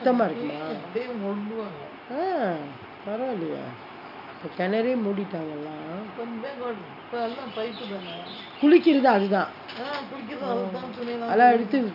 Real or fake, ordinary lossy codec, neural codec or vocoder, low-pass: real; none; none; 5.4 kHz